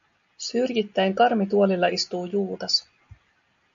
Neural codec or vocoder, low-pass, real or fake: none; 7.2 kHz; real